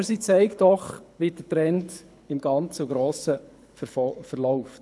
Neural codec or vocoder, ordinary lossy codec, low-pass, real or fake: codec, 24 kHz, 6 kbps, HILCodec; none; none; fake